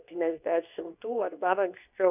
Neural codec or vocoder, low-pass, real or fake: codec, 24 kHz, 0.9 kbps, WavTokenizer, medium speech release version 2; 3.6 kHz; fake